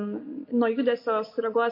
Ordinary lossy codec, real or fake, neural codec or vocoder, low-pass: MP3, 32 kbps; real; none; 5.4 kHz